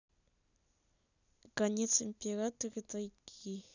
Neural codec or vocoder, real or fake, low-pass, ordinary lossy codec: none; real; 7.2 kHz; none